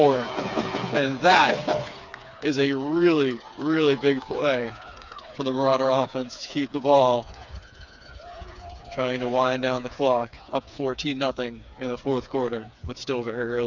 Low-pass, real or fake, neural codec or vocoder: 7.2 kHz; fake; codec, 16 kHz, 4 kbps, FreqCodec, smaller model